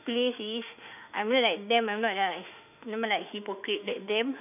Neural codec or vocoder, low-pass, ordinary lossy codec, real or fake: autoencoder, 48 kHz, 32 numbers a frame, DAC-VAE, trained on Japanese speech; 3.6 kHz; none; fake